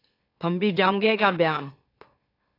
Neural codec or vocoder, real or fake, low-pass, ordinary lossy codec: autoencoder, 44.1 kHz, a latent of 192 numbers a frame, MeloTTS; fake; 5.4 kHz; AAC, 32 kbps